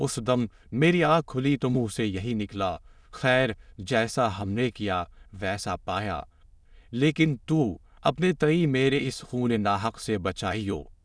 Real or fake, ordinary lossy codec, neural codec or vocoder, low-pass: fake; none; autoencoder, 22.05 kHz, a latent of 192 numbers a frame, VITS, trained on many speakers; none